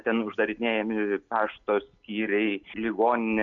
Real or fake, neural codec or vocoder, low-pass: real; none; 7.2 kHz